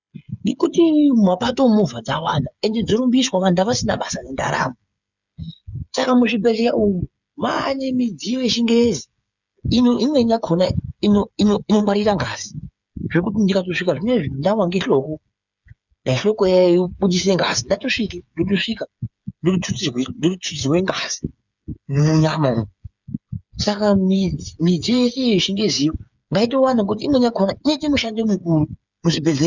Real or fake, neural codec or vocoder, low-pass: fake; codec, 16 kHz, 8 kbps, FreqCodec, smaller model; 7.2 kHz